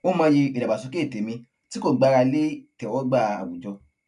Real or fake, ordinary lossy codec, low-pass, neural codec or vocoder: real; none; 10.8 kHz; none